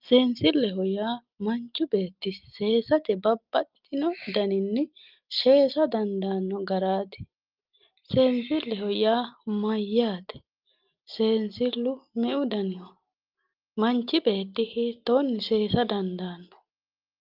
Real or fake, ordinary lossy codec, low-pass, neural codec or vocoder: real; Opus, 24 kbps; 5.4 kHz; none